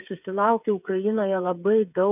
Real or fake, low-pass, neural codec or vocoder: fake; 3.6 kHz; codec, 16 kHz, 8 kbps, FreqCodec, smaller model